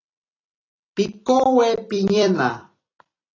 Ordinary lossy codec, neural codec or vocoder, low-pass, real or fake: AAC, 32 kbps; vocoder, 44.1 kHz, 128 mel bands every 256 samples, BigVGAN v2; 7.2 kHz; fake